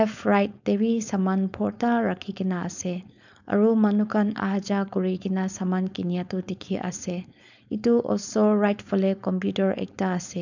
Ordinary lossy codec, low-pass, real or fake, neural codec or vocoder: none; 7.2 kHz; fake; codec, 16 kHz, 4.8 kbps, FACodec